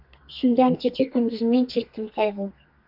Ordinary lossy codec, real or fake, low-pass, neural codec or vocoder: AAC, 48 kbps; fake; 5.4 kHz; codec, 32 kHz, 1.9 kbps, SNAC